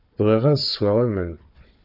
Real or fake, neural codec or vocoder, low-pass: fake; codec, 16 kHz, 4 kbps, FunCodec, trained on Chinese and English, 50 frames a second; 5.4 kHz